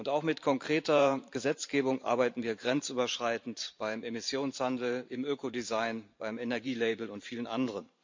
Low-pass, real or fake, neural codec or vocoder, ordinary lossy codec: 7.2 kHz; fake; vocoder, 44.1 kHz, 128 mel bands every 512 samples, BigVGAN v2; MP3, 64 kbps